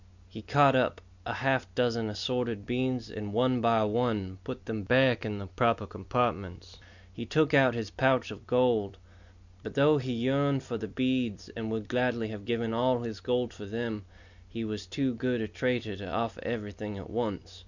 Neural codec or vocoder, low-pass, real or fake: none; 7.2 kHz; real